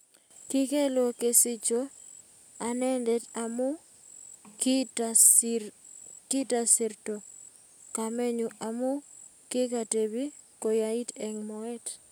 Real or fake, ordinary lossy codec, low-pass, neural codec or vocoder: real; none; none; none